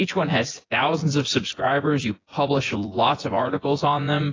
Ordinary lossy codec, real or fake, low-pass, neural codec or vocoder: AAC, 32 kbps; fake; 7.2 kHz; vocoder, 24 kHz, 100 mel bands, Vocos